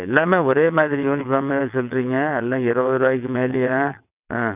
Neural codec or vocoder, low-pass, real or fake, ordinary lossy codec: vocoder, 22.05 kHz, 80 mel bands, WaveNeXt; 3.6 kHz; fake; none